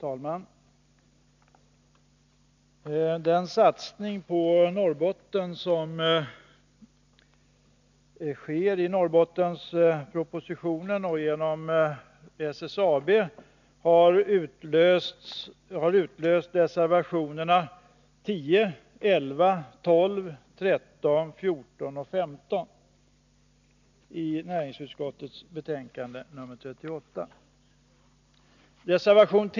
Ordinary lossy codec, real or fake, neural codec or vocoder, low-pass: none; real; none; 7.2 kHz